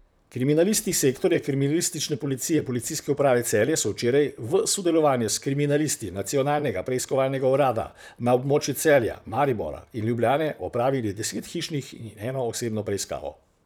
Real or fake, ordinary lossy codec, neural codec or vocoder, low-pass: fake; none; vocoder, 44.1 kHz, 128 mel bands, Pupu-Vocoder; none